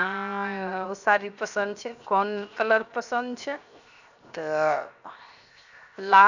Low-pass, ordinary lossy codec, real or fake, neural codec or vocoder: 7.2 kHz; none; fake; codec, 16 kHz, 0.7 kbps, FocalCodec